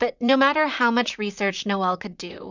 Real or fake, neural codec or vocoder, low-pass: fake; vocoder, 44.1 kHz, 128 mel bands, Pupu-Vocoder; 7.2 kHz